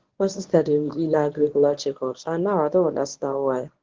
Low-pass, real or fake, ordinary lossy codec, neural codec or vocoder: 7.2 kHz; fake; Opus, 16 kbps; codec, 24 kHz, 0.9 kbps, WavTokenizer, medium speech release version 1